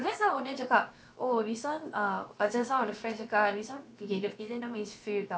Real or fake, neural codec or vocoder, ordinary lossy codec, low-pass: fake; codec, 16 kHz, about 1 kbps, DyCAST, with the encoder's durations; none; none